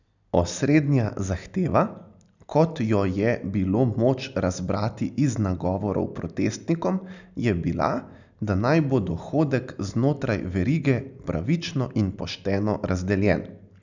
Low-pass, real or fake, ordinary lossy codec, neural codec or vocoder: 7.2 kHz; real; none; none